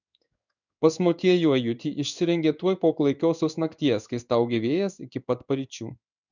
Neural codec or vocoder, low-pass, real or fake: codec, 16 kHz in and 24 kHz out, 1 kbps, XY-Tokenizer; 7.2 kHz; fake